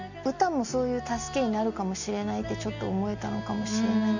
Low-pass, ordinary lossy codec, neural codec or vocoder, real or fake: 7.2 kHz; none; none; real